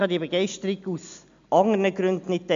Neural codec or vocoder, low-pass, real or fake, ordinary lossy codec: none; 7.2 kHz; real; MP3, 64 kbps